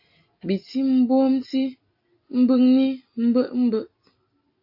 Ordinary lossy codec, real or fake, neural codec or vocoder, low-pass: AAC, 48 kbps; real; none; 5.4 kHz